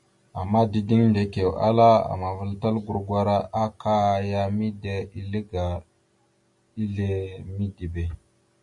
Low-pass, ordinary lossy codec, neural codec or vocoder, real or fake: 10.8 kHz; MP3, 48 kbps; none; real